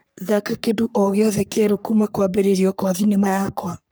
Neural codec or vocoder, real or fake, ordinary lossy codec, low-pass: codec, 44.1 kHz, 3.4 kbps, Pupu-Codec; fake; none; none